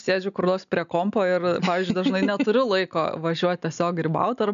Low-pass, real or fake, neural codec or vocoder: 7.2 kHz; real; none